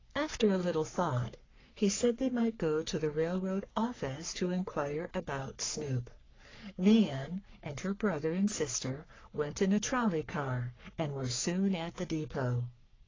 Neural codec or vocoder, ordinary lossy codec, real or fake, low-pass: codec, 44.1 kHz, 3.4 kbps, Pupu-Codec; AAC, 32 kbps; fake; 7.2 kHz